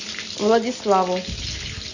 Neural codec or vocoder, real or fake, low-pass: none; real; 7.2 kHz